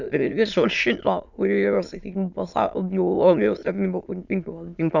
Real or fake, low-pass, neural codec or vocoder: fake; 7.2 kHz; autoencoder, 22.05 kHz, a latent of 192 numbers a frame, VITS, trained on many speakers